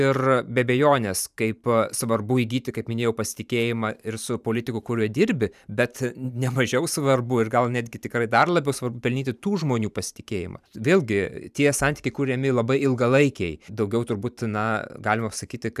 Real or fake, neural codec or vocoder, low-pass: fake; vocoder, 44.1 kHz, 128 mel bands every 512 samples, BigVGAN v2; 14.4 kHz